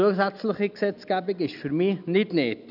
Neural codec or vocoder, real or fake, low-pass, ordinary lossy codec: none; real; 5.4 kHz; none